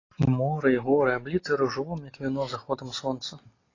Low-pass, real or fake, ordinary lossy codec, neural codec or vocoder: 7.2 kHz; real; AAC, 32 kbps; none